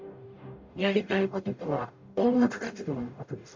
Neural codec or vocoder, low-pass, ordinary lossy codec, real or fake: codec, 44.1 kHz, 0.9 kbps, DAC; 7.2 kHz; MP3, 48 kbps; fake